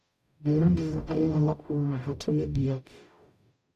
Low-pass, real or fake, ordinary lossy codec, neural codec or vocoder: 14.4 kHz; fake; none; codec, 44.1 kHz, 0.9 kbps, DAC